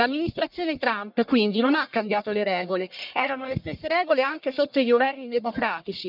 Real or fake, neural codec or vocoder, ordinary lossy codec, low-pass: fake; codec, 44.1 kHz, 1.7 kbps, Pupu-Codec; none; 5.4 kHz